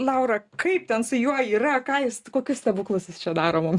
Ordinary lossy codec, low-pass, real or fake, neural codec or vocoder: Opus, 64 kbps; 10.8 kHz; real; none